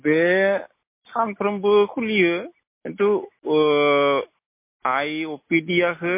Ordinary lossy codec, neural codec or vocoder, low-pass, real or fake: MP3, 24 kbps; none; 3.6 kHz; real